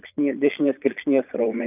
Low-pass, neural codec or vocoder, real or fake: 3.6 kHz; vocoder, 22.05 kHz, 80 mel bands, Vocos; fake